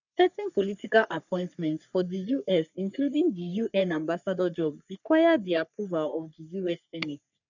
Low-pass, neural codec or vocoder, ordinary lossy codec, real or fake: 7.2 kHz; codec, 44.1 kHz, 3.4 kbps, Pupu-Codec; none; fake